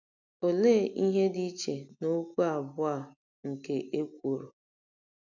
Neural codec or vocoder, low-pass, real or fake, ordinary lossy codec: none; 7.2 kHz; real; none